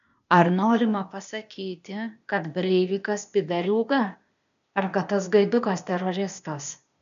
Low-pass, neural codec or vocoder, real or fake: 7.2 kHz; codec, 16 kHz, 0.8 kbps, ZipCodec; fake